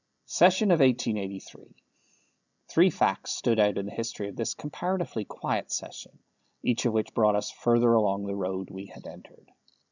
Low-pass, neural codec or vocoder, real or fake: 7.2 kHz; none; real